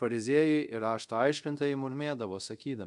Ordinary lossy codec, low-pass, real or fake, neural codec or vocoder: MP3, 96 kbps; 10.8 kHz; fake; codec, 24 kHz, 0.5 kbps, DualCodec